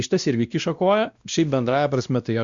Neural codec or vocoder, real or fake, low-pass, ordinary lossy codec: codec, 16 kHz, 1 kbps, X-Codec, WavLM features, trained on Multilingual LibriSpeech; fake; 7.2 kHz; Opus, 64 kbps